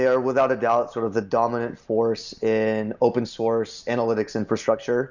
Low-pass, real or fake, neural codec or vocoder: 7.2 kHz; real; none